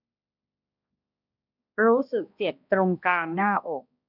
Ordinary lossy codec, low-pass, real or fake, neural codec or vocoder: none; 5.4 kHz; fake; codec, 16 kHz, 1 kbps, X-Codec, HuBERT features, trained on balanced general audio